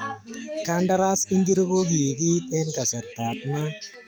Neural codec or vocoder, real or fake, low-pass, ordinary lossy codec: codec, 44.1 kHz, 7.8 kbps, DAC; fake; none; none